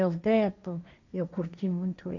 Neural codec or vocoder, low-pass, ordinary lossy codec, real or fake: codec, 16 kHz, 1.1 kbps, Voila-Tokenizer; 7.2 kHz; none; fake